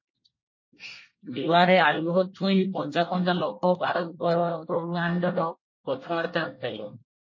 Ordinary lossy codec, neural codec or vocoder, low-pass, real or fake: MP3, 32 kbps; codec, 24 kHz, 1 kbps, SNAC; 7.2 kHz; fake